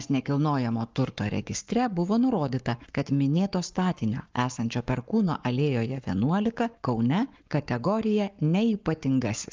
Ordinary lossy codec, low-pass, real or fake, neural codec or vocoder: Opus, 24 kbps; 7.2 kHz; fake; codec, 44.1 kHz, 7.8 kbps, Pupu-Codec